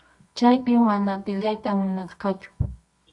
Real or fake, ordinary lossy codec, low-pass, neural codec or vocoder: fake; Opus, 64 kbps; 10.8 kHz; codec, 24 kHz, 0.9 kbps, WavTokenizer, medium music audio release